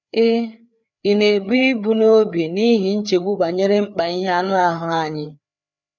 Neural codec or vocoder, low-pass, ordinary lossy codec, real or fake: codec, 16 kHz, 4 kbps, FreqCodec, larger model; 7.2 kHz; none; fake